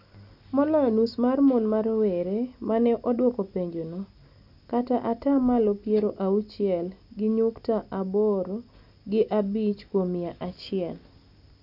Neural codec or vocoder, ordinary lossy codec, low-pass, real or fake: none; none; 5.4 kHz; real